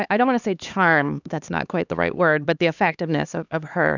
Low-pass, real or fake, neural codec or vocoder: 7.2 kHz; fake; codec, 16 kHz, 2 kbps, X-Codec, HuBERT features, trained on LibriSpeech